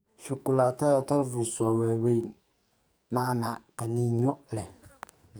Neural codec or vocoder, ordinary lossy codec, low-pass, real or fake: codec, 44.1 kHz, 2.6 kbps, SNAC; none; none; fake